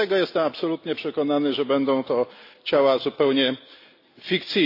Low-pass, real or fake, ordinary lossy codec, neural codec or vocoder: 5.4 kHz; real; AAC, 32 kbps; none